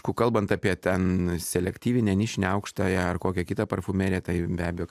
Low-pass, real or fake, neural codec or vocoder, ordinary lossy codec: 14.4 kHz; real; none; AAC, 96 kbps